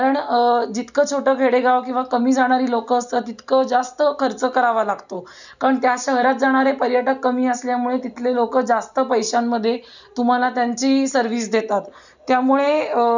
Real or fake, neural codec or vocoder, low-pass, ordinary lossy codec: real; none; 7.2 kHz; none